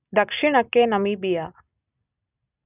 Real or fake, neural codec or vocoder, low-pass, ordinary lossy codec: real; none; 3.6 kHz; none